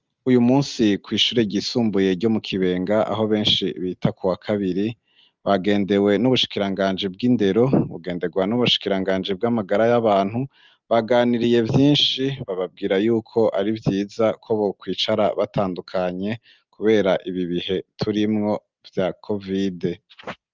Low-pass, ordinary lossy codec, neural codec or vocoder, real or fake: 7.2 kHz; Opus, 24 kbps; none; real